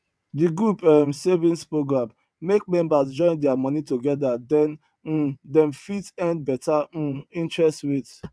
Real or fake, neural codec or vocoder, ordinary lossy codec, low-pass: fake; vocoder, 22.05 kHz, 80 mel bands, WaveNeXt; none; none